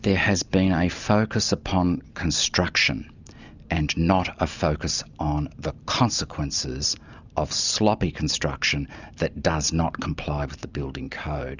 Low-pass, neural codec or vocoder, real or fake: 7.2 kHz; none; real